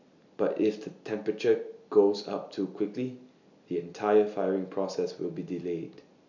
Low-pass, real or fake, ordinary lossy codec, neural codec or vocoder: 7.2 kHz; real; none; none